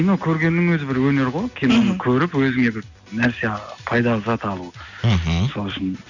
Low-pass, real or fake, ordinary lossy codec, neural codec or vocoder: 7.2 kHz; real; none; none